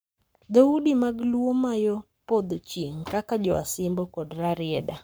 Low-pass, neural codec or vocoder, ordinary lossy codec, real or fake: none; codec, 44.1 kHz, 7.8 kbps, Pupu-Codec; none; fake